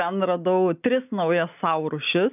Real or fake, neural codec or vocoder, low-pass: real; none; 3.6 kHz